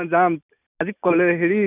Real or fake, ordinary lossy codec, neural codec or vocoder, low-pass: real; none; none; 3.6 kHz